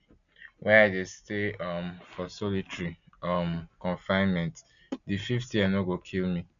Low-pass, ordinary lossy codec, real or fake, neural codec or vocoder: 7.2 kHz; none; real; none